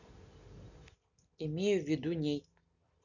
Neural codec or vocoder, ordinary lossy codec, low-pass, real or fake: none; none; 7.2 kHz; real